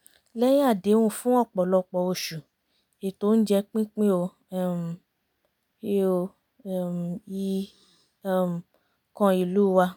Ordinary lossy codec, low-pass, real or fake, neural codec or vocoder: none; none; real; none